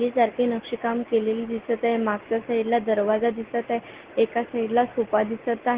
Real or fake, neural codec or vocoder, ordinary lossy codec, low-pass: real; none; Opus, 16 kbps; 3.6 kHz